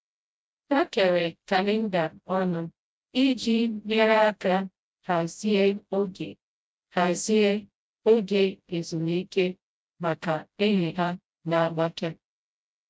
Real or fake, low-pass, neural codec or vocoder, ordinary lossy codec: fake; none; codec, 16 kHz, 0.5 kbps, FreqCodec, smaller model; none